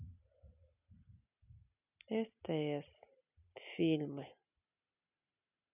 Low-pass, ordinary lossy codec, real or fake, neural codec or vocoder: 3.6 kHz; none; real; none